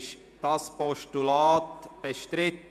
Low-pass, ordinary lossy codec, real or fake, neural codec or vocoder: 14.4 kHz; MP3, 96 kbps; fake; vocoder, 48 kHz, 128 mel bands, Vocos